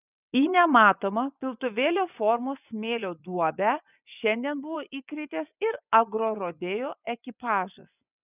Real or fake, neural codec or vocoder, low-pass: fake; vocoder, 24 kHz, 100 mel bands, Vocos; 3.6 kHz